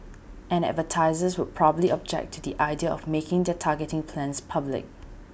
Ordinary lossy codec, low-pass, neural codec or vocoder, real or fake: none; none; none; real